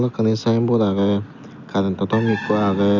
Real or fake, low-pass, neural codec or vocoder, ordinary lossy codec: real; 7.2 kHz; none; none